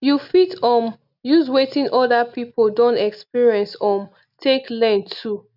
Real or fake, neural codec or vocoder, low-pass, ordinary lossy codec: real; none; 5.4 kHz; none